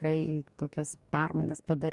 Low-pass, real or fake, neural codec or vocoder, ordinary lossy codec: 10.8 kHz; fake; codec, 44.1 kHz, 2.6 kbps, DAC; Opus, 64 kbps